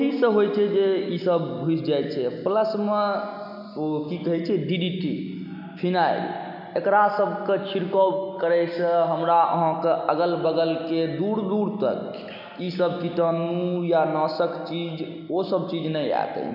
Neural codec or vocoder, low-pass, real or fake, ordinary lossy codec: none; 5.4 kHz; real; none